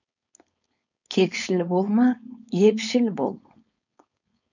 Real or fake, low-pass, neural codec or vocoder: fake; 7.2 kHz; codec, 16 kHz, 4.8 kbps, FACodec